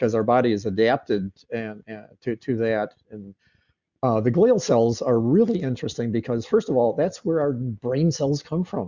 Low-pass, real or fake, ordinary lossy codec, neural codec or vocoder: 7.2 kHz; real; Opus, 64 kbps; none